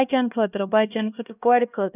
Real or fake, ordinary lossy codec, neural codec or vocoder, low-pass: fake; none; codec, 16 kHz, 1 kbps, X-Codec, HuBERT features, trained on LibriSpeech; 3.6 kHz